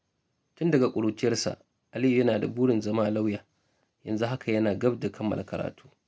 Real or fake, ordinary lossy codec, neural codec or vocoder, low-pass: real; none; none; none